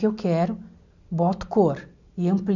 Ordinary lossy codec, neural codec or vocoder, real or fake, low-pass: none; none; real; 7.2 kHz